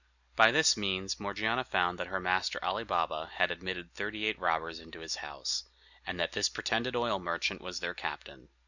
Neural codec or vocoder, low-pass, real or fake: none; 7.2 kHz; real